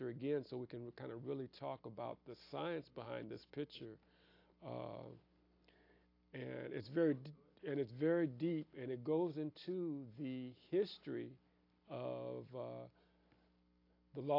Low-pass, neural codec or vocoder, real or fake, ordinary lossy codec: 5.4 kHz; none; real; AAC, 32 kbps